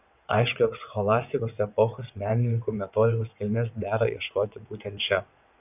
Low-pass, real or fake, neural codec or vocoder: 3.6 kHz; fake; vocoder, 22.05 kHz, 80 mel bands, WaveNeXt